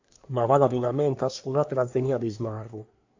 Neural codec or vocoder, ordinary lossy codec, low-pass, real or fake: codec, 24 kHz, 1 kbps, SNAC; AAC, 48 kbps; 7.2 kHz; fake